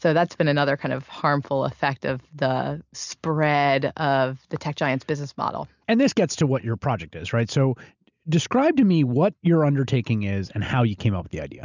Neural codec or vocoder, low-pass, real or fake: none; 7.2 kHz; real